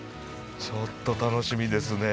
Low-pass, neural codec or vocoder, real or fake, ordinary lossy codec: none; none; real; none